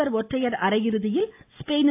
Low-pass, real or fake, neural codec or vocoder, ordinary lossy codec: 3.6 kHz; real; none; AAC, 24 kbps